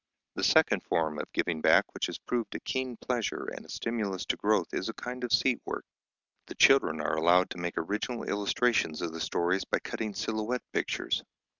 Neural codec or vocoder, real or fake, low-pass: none; real; 7.2 kHz